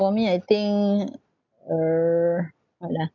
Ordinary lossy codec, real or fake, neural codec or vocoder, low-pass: none; real; none; 7.2 kHz